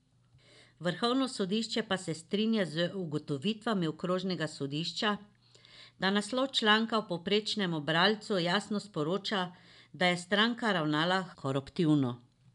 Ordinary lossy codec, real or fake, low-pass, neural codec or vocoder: none; real; 10.8 kHz; none